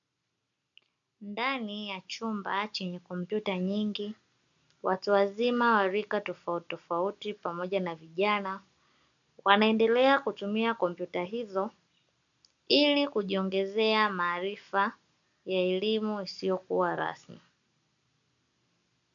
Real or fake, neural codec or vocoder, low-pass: real; none; 7.2 kHz